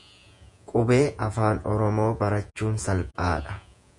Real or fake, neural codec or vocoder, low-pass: fake; vocoder, 48 kHz, 128 mel bands, Vocos; 10.8 kHz